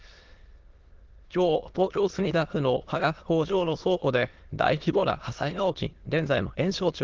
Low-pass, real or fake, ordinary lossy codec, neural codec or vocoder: 7.2 kHz; fake; Opus, 16 kbps; autoencoder, 22.05 kHz, a latent of 192 numbers a frame, VITS, trained on many speakers